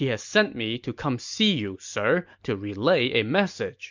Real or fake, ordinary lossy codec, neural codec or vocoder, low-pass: real; MP3, 64 kbps; none; 7.2 kHz